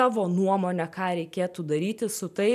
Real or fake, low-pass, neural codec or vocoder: fake; 14.4 kHz; vocoder, 44.1 kHz, 128 mel bands every 512 samples, BigVGAN v2